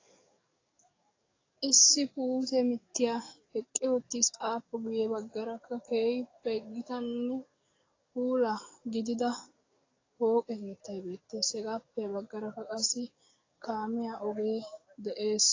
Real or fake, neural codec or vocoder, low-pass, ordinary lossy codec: fake; codec, 44.1 kHz, 7.8 kbps, DAC; 7.2 kHz; AAC, 32 kbps